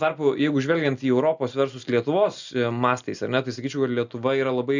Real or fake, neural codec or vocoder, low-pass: real; none; 7.2 kHz